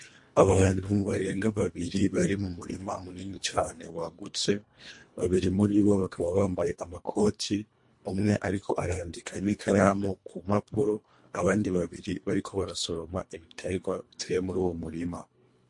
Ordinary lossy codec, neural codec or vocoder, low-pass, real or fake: MP3, 48 kbps; codec, 24 kHz, 1.5 kbps, HILCodec; 10.8 kHz; fake